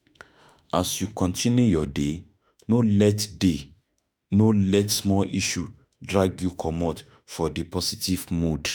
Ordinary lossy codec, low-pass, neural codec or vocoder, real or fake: none; none; autoencoder, 48 kHz, 32 numbers a frame, DAC-VAE, trained on Japanese speech; fake